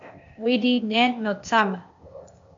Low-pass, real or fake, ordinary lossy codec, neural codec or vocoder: 7.2 kHz; fake; AAC, 64 kbps; codec, 16 kHz, 0.8 kbps, ZipCodec